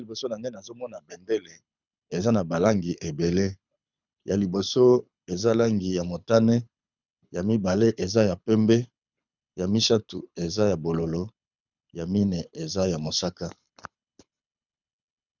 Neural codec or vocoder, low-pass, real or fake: codec, 24 kHz, 6 kbps, HILCodec; 7.2 kHz; fake